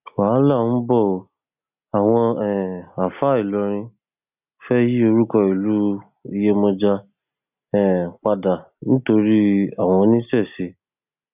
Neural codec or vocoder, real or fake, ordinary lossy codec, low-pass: none; real; none; 3.6 kHz